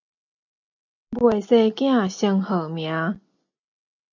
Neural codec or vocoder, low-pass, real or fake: none; 7.2 kHz; real